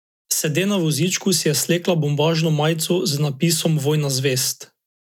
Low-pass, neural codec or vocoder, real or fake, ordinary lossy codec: 19.8 kHz; none; real; none